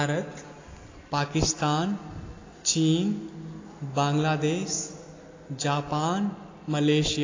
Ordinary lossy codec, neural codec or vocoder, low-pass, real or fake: AAC, 32 kbps; none; 7.2 kHz; real